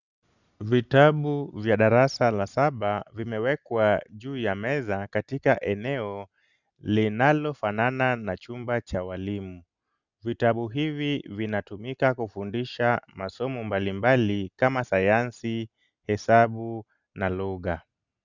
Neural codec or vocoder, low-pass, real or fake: none; 7.2 kHz; real